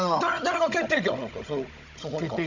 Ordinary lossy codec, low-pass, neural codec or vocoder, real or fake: none; 7.2 kHz; codec, 16 kHz, 16 kbps, FunCodec, trained on Chinese and English, 50 frames a second; fake